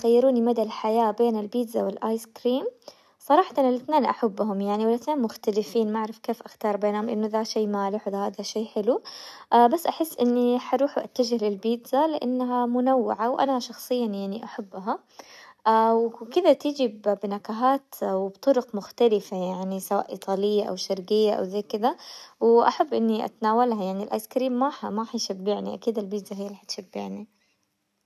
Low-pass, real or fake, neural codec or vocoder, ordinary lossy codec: 14.4 kHz; real; none; none